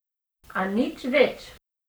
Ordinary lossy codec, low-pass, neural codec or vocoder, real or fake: none; none; codec, 44.1 kHz, 7.8 kbps, Pupu-Codec; fake